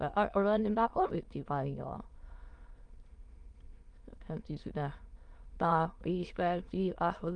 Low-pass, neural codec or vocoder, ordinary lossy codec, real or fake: 9.9 kHz; autoencoder, 22.05 kHz, a latent of 192 numbers a frame, VITS, trained on many speakers; Opus, 32 kbps; fake